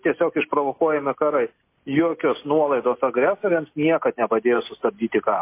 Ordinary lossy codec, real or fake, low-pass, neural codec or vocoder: MP3, 24 kbps; real; 3.6 kHz; none